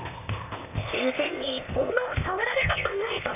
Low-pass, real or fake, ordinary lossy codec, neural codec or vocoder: 3.6 kHz; fake; none; codec, 16 kHz, 0.8 kbps, ZipCodec